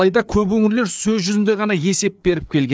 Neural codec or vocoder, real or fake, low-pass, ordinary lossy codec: codec, 16 kHz, 4 kbps, FreqCodec, larger model; fake; none; none